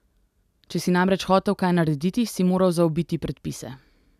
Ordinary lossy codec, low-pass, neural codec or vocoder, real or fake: none; 14.4 kHz; none; real